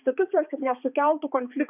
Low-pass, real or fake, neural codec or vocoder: 3.6 kHz; fake; codec, 16 kHz, 4 kbps, X-Codec, HuBERT features, trained on balanced general audio